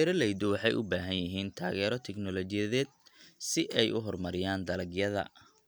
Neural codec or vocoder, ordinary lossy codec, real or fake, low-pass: none; none; real; none